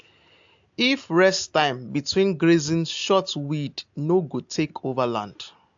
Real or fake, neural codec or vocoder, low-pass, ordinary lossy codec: real; none; 7.2 kHz; AAC, 64 kbps